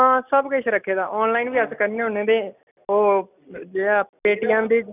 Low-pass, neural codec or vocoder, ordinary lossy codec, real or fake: 3.6 kHz; none; none; real